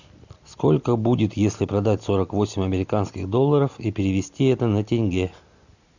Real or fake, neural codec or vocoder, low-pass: real; none; 7.2 kHz